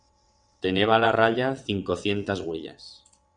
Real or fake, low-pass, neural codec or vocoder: fake; 9.9 kHz; vocoder, 22.05 kHz, 80 mel bands, WaveNeXt